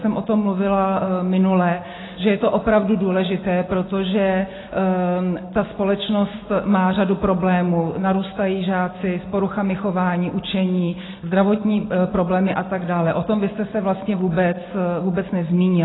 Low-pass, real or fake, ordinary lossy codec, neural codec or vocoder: 7.2 kHz; real; AAC, 16 kbps; none